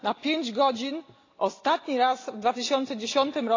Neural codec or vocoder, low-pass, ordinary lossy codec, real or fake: none; 7.2 kHz; AAC, 32 kbps; real